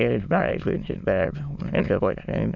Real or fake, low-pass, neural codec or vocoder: fake; 7.2 kHz; autoencoder, 22.05 kHz, a latent of 192 numbers a frame, VITS, trained on many speakers